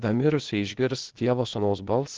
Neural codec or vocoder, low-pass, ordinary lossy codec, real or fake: codec, 16 kHz, 0.8 kbps, ZipCodec; 7.2 kHz; Opus, 16 kbps; fake